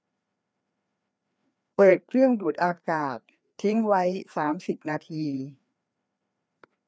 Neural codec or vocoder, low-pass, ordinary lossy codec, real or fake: codec, 16 kHz, 2 kbps, FreqCodec, larger model; none; none; fake